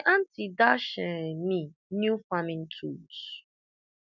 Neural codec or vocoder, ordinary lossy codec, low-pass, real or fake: none; none; 7.2 kHz; real